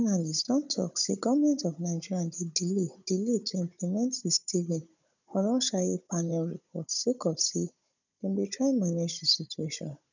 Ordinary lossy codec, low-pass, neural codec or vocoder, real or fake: none; 7.2 kHz; codec, 16 kHz, 16 kbps, FunCodec, trained on Chinese and English, 50 frames a second; fake